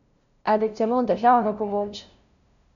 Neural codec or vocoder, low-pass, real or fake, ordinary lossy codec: codec, 16 kHz, 0.5 kbps, FunCodec, trained on LibriTTS, 25 frames a second; 7.2 kHz; fake; none